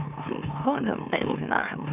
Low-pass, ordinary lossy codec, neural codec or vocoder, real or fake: 3.6 kHz; none; autoencoder, 44.1 kHz, a latent of 192 numbers a frame, MeloTTS; fake